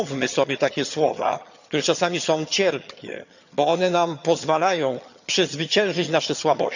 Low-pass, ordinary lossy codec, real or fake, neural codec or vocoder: 7.2 kHz; none; fake; vocoder, 22.05 kHz, 80 mel bands, HiFi-GAN